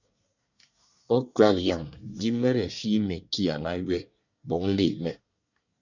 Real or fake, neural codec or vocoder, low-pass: fake; codec, 24 kHz, 1 kbps, SNAC; 7.2 kHz